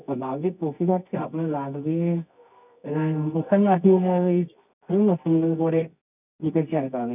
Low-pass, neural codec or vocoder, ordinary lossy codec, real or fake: 3.6 kHz; codec, 24 kHz, 0.9 kbps, WavTokenizer, medium music audio release; none; fake